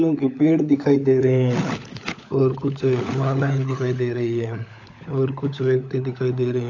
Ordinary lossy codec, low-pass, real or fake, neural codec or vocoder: AAC, 48 kbps; 7.2 kHz; fake; codec, 16 kHz, 16 kbps, FunCodec, trained on LibriTTS, 50 frames a second